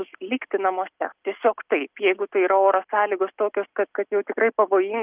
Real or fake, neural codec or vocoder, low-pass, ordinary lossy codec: real; none; 3.6 kHz; Opus, 24 kbps